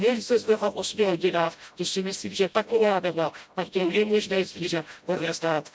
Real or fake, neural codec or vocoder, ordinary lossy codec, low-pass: fake; codec, 16 kHz, 0.5 kbps, FreqCodec, smaller model; none; none